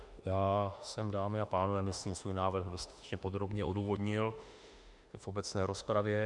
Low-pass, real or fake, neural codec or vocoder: 10.8 kHz; fake; autoencoder, 48 kHz, 32 numbers a frame, DAC-VAE, trained on Japanese speech